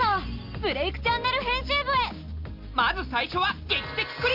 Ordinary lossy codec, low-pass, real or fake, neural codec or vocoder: Opus, 24 kbps; 5.4 kHz; real; none